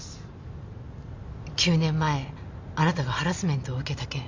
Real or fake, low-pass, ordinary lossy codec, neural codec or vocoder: real; 7.2 kHz; MP3, 64 kbps; none